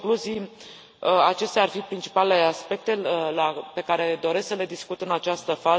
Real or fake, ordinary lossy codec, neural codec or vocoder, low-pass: real; none; none; none